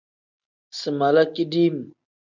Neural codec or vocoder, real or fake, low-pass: none; real; 7.2 kHz